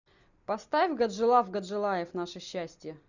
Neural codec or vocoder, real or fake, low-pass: none; real; 7.2 kHz